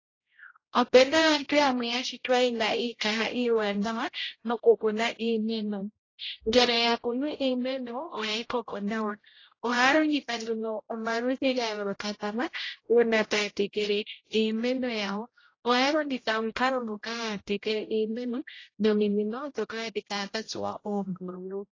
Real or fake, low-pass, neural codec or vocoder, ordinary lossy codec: fake; 7.2 kHz; codec, 16 kHz, 0.5 kbps, X-Codec, HuBERT features, trained on general audio; AAC, 32 kbps